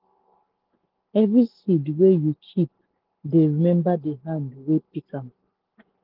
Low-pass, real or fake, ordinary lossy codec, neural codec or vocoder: 5.4 kHz; real; Opus, 16 kbps; none